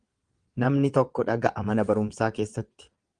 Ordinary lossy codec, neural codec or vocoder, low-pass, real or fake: Opus, 24 kbps; vocoder, 22.05 kHz, 80 mel bands, WaveNeXt; 9.9 kHz; fake